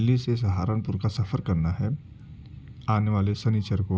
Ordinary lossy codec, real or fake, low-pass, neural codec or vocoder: none; real; none; none